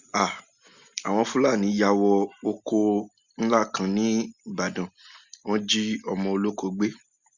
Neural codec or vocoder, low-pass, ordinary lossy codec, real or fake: none; 7.2 kHz; Opus, 64 kbps; real